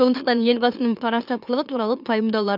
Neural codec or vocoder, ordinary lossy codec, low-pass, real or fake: autoencoder, 44.1 kHz, a latent of 192 numbers a frame, MeloTTS; none; 5.4 kHz; fake